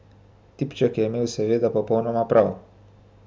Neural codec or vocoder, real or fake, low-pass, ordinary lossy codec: none; real; none; none